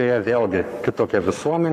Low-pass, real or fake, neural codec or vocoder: 14.4 kHz; fake; codec, 44.1 kHz, 7.8 kbps, Pupu-Codec